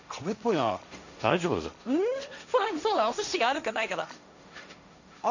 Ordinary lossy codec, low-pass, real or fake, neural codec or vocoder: none; 7.2 kHz; fake; codec, 16 kHz, 1.1 kbps, Voila-Tokenizer